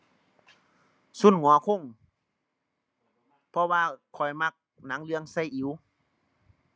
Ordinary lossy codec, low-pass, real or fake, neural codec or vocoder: none; none; real; none